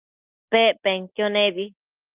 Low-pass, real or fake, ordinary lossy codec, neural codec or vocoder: 3.6 kHz; real; Opus, 24 kbps; none